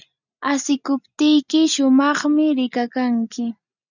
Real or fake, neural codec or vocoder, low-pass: real; none; 7.2 kHz